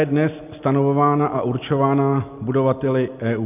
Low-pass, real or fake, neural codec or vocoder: 3.6 kHz; real; none